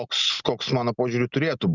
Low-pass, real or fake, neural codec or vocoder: 7.2 kHz; real; none